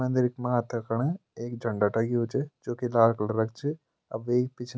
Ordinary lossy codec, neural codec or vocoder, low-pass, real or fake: none; none; none; real